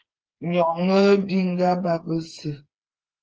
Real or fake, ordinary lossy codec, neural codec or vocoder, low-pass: fake; Opus, 24 kbps; codec, 16 kHz, 4 kbps, FreqCodec, smaller model; 7.2 kHz